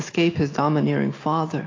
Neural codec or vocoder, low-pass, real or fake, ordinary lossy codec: none; 7.2 kHz; real; AAC, 32 kbps